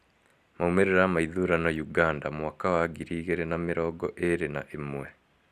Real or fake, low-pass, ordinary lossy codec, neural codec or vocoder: real; 14.4 kHz; Opus, 64 kbps; none